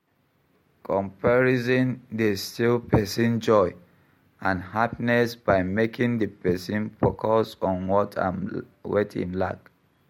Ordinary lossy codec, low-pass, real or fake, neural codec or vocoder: MP3, 64 kbps; 19.8 kHz; real; none